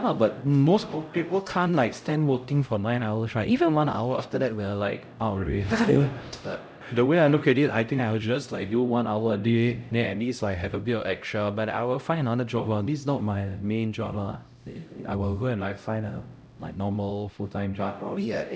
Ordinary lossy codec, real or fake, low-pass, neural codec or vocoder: none; fake; none; codec, 16 kHz, 0.5 kbps, X-Codec, HuBERT features, trained on LibriSpeech